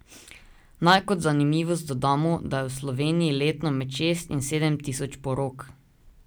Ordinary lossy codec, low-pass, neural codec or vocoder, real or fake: none; none; none; real